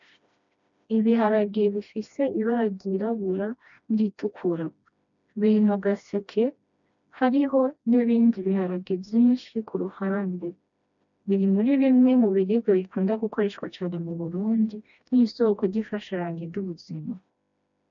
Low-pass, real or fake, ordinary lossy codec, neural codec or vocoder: 7.2 kHz; fake; MP3, 64 kbps; codec, 16 kHz, 1 kbps, FreqCodec, smaller model